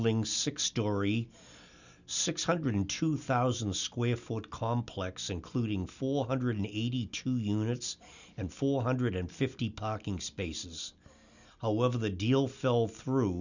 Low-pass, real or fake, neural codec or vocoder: 7.2 kHz; real; none